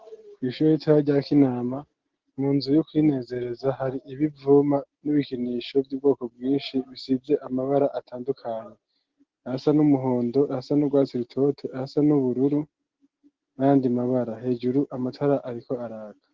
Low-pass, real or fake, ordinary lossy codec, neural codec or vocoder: 7.2 kHz; real; Opus, 16 kbps; none